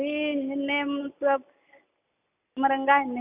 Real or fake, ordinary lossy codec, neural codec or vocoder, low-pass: real; none; none; 3.6 kHz